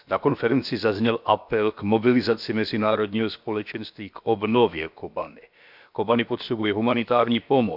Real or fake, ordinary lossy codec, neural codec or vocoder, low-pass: fake; none; codec, 16 kHz, about 1 kbps, DyCAST, with the encoder's durations; 5.4 kHz